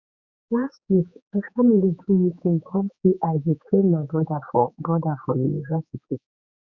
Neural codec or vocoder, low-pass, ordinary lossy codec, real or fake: codec, 16 kHz, 4 kbps, X-Codec, HuBERT features, trained on general audio; 7.2 kHz; Opus, 64 kbps; fake